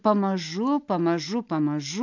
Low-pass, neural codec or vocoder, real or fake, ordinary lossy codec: 7.2 kHz; none; real; AAC, 48 kbps